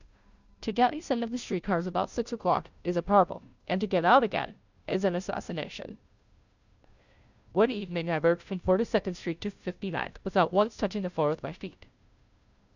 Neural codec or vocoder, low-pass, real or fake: codec, 16 kHz, 0.5 kbps, FunCodec, trained on Chinese and English, 25 frames a second; 7.2 kHz; fake